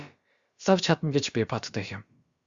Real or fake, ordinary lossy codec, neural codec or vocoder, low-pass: fake; Opus, 64 kbps; codec, 16 kHz, about 1 kbps, DyCAST, with the encoder's durations; 7.2 kHz